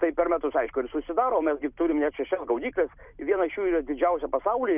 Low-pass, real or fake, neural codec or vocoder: 3.6 kHz; real; none